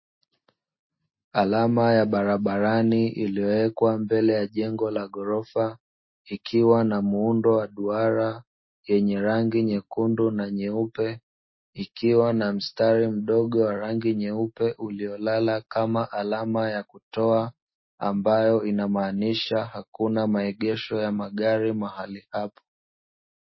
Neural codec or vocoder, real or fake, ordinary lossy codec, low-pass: none; real; MP3, 24 kbps; 7.2 kHz